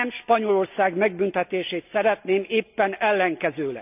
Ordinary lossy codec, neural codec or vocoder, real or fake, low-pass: none; none; real; 3.6 kHz